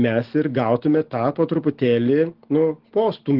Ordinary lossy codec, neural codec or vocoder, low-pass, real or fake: Opus, 16 kbps; none; 5.4 kHz; real